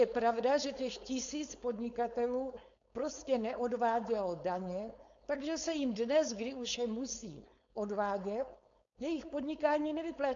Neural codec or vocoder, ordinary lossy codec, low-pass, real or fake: codec, 16 kHz, 4.8 kbps, FACodec; AAC, 64 kbps; 7.2 kHz; fake